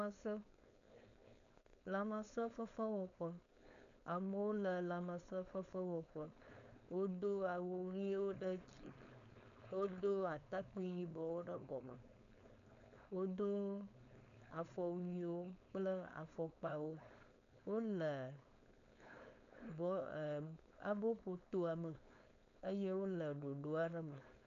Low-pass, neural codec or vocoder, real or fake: 7.2 kHz; codec, 16 kHz, 4.8 kbps, FACodec; fake